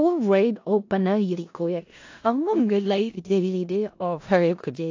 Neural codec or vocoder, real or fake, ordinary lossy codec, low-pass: codec, 16 kHz in and 24 kHz out, 0.4 kbps, LongCat-Audio-Codec, four codebook decoder; fake; AAC, 48 kbps; 7.2 kHz